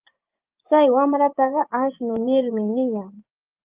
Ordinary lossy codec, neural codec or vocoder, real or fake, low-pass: Opus, 32 kbps; vocoder, 24 kHz, 100 mel bands, Vocos; fake; 3.6 kHz